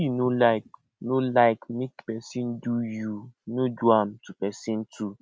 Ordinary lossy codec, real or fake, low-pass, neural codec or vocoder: none; real; none; none